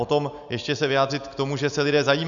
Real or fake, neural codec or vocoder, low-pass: real; none; 7.2 kHz